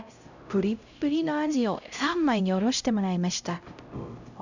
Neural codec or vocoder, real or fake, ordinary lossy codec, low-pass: codec, 16 kHz, 0.5 kbps, X-Codec, HuBERT features, trained on LibriSpeech; fake; none; 7.2 kHz